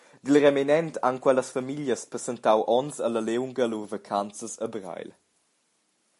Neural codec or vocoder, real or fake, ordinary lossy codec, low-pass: none; real; MP3, 48 kbps; 14.4 kHz